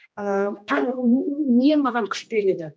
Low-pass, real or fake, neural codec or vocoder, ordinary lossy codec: none; fake; codec, 16 kHz, 1 kbps, X-Codec, HuBERT features, trained on general audio; none